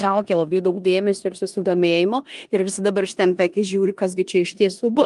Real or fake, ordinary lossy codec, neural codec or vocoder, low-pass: fake; Opus, 32 kbps; codec, 16 kHz in and 24 kHz out, 0.9 kbps, LongCat-Audio-Codec, four codebook decoder; 10.8 kHz